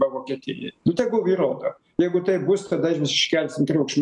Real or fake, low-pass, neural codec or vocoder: real; 10.8 kHz; none